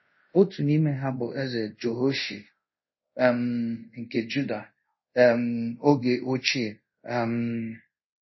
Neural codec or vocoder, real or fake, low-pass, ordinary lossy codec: codec, 24 kHz, 0.5 kbps, DualCodec; fake; 7.2 kHz; MP3, 24 kbps